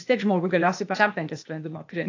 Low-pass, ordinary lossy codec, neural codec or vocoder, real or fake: 7.2 kHz; AAC, 48 kbps; codec, 16 kHz, 0.8 kbps, ZipCodec; fake